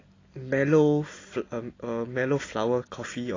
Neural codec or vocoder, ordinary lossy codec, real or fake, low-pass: none; AAC, 32 kbps; real; 7.2 kHz